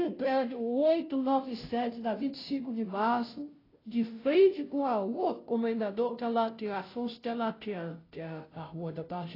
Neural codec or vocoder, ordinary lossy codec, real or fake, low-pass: codec, 16 kHz, 0.5 kbps, FunCodec, trained on Chinese and English, 25 frames a second; AAC, 24 kbps; fake; 5.4 kHz